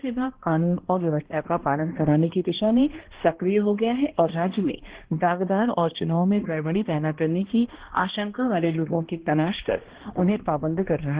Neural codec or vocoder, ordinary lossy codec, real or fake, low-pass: codec, 16 kHz, 1 kbps, X-Codec, HuBERT features, trained on balanced general audio; Opus, 16 kbps; fake; 3.6 kHz